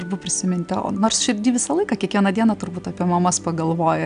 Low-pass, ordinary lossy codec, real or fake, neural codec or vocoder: 9.9 kHz; MP3, 96 kbps; real; none